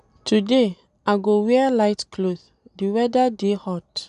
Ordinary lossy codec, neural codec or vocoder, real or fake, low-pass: none; none; real; 10.8 kHz